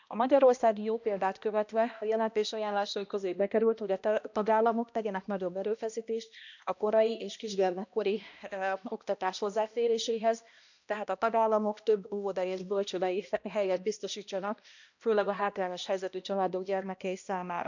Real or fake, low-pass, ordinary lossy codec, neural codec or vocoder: fake; 7.2 kHz; none; codec, 16 kHz, 1 kbps, X-Codec, HuBERT features, trained on balanced general audio